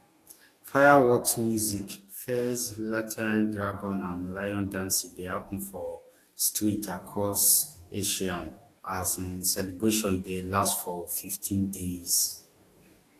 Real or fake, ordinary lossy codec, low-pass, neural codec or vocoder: fake; AAC, 64 kbps; 14.4 kHz; codec, 44.1 kHz, 2.6 kbps, DAC